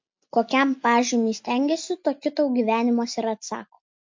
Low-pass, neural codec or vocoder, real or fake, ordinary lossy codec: 7.2 kHz; none; real; MP3, 48 kbps